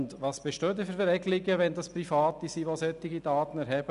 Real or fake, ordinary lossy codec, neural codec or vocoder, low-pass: real; none; none; 10.8 kHz